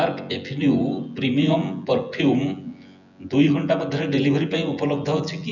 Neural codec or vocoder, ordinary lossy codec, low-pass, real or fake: vocoder, 24 kHz, 100 mel bands, Vocos; none; 7.2 kHz; fake